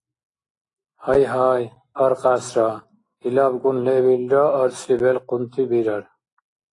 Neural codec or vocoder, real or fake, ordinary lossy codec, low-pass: none; real; AAC, 32 kbps; 10.8 kHz